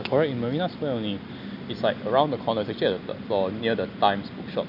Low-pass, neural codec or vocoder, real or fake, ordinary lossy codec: 5.4 kHz; none; real; none